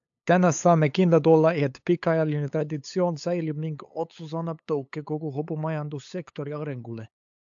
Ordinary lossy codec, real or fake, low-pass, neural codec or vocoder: AAC, 64 kbps; fake; 7.2 kHz; codec, 16 kHz, 8 kbps, FunCodec, trained on LibriTTS, 25 frames a second